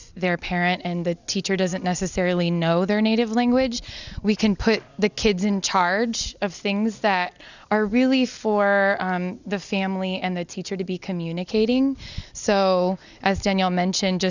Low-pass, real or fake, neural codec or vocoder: 7.2 kHz; real; none